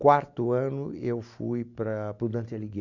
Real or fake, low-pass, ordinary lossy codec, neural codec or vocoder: real; 7.2 kHz; none; none